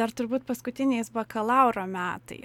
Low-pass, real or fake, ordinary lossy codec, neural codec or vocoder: 19.8 kHz; real; MP3, 96 kbps; none